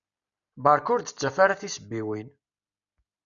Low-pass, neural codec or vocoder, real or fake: 7.2 kHz; none; real